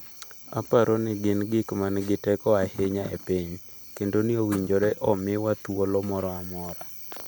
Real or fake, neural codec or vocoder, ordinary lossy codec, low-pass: real; none; none; none